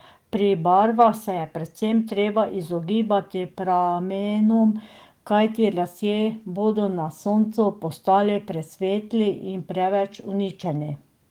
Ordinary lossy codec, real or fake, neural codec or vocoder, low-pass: Opus, 24 kbps; fake; codec, 44.1 kHz, 7.8 kbps, DAC; 19.8 kHz